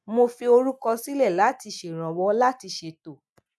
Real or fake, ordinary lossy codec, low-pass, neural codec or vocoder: real; none; none; none